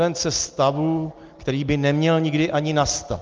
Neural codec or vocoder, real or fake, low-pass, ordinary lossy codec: none; real; 7.2 kHz; Opus, 24 kbps